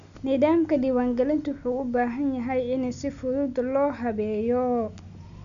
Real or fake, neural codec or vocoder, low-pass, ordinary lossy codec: real; none; 7.2 kHz; none